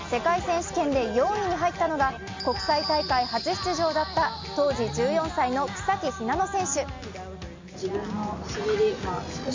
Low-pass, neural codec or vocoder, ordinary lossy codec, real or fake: 7.2 kHz; none; MP3, 48 kbps; real